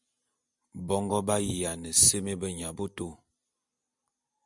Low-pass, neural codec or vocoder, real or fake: 10.8 kHz; none; real